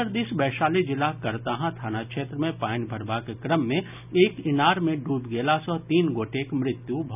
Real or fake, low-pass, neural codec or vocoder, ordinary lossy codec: real; 3.6 kHz; none; none